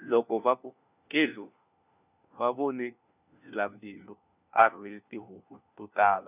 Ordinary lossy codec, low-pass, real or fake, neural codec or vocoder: none; 3.6 kHz; fake; codec, 16 kHz, 1 kbps, FunCodec, trained on LibriTTS, 50 frames a second